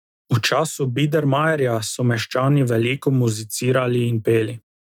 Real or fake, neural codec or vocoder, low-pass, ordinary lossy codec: real; none; 19.8 kHz; none